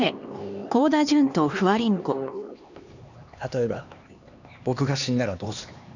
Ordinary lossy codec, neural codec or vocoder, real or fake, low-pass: none; codec, 16 kHz, 2 kbps, X-Codec, HuBERT features, trained on LibriSpeech; fake; 7.2 kHz